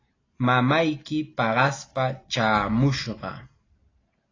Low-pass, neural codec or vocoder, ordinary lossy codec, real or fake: 7.2 kHz; none; AAC, 32 kbps; real